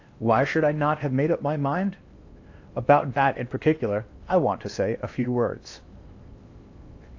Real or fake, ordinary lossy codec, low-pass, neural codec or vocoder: fake; AAC, 48 kbps; 7.2 kHz; codec, 16 kHz in and 24 kHz out, 0.8 kbps, FocalCodec, streaming, 65536 codes